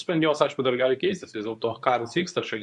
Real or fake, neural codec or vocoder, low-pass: fake; codec, 24 kHz, 0.9 kbps, WavTokenizer, medium speech release version 2; 10.8 kHz